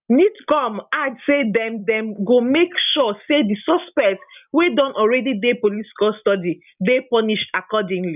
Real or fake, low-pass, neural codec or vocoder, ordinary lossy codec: real; 3.6 kHz; none; none